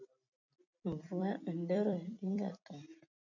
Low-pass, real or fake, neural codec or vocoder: 7.2 kHz; fake; vocoder, 44.1 kHz, 128 mel bands every 256 samples, BigVGAN v2